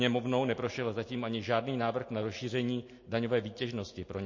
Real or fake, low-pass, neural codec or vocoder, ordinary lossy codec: real; 7.2 kHz; none; MP3, 32 kbps